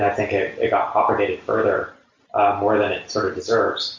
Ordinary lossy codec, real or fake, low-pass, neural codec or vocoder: MP3, 48 kbps; real; 7.2 kHz; none